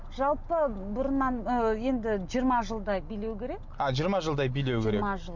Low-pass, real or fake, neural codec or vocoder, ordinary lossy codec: 7.2 kHz; real; none; MP3, 64 kbps